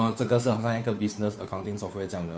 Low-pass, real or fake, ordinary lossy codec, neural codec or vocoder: none; fake; none; codec, 16 kHz, 2 kbps, FunCodec, trained on Chinese and English, 25 frames a second